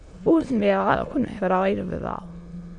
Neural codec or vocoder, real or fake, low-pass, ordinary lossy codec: autoencoder, 22.05 kHz, a latent of 192 numbers a frame, VITS, trained on many speakers; fake; 9.9 kHz; AAC, 64 kbps